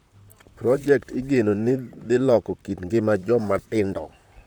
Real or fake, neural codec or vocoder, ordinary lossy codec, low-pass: fake; vocoder, 44.1 kHz, 128 mel bands, Pupu-Vocoder; none; none